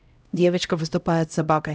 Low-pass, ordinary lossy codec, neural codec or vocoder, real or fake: none; none; codec, 16 kHz, 0.5 kbps, X-Codec, HuBERT features, trained on LibriSpeech; fake